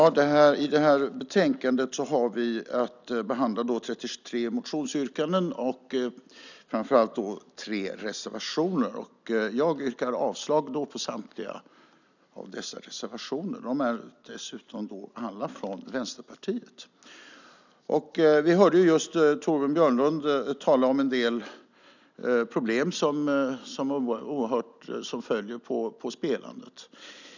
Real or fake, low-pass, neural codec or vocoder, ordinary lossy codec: real; 7.2 kHz; none; none